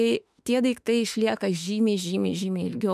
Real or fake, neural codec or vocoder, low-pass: fake; autoencoder, 48 kHz, 32 numbers a frame, DAC-VAE, trained on Japanese speech; 14.4 kHz